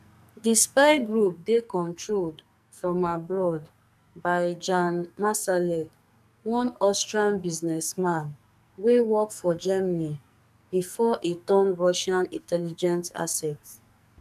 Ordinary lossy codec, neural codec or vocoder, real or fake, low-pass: none; codec, 44.1 kHz, 2.6 kbps, SNAC; fake; 14.4 kHz